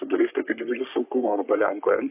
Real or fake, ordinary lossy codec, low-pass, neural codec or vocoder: fake; AAC, 32 kbps; 3.6 kHz; codec, 44.1 kHz, 3.4 kbps, Pupu-Codec